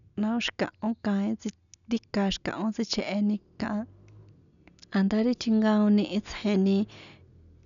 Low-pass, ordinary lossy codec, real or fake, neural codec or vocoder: 7.2 kHz; none; real; none